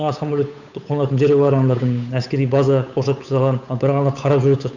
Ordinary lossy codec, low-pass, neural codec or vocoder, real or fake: none; 7.2 kHz; codec, 16 kHz, 8 kbps, FunCodec, trained on Chinese and English, 25 frames a second; fake